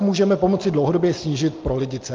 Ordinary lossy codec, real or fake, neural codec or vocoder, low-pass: Opus, 24 kbps; real; none; 7.2 kHz